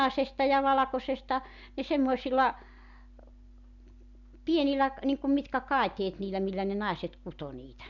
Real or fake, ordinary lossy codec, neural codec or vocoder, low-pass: real; none; none; 7.2 kHz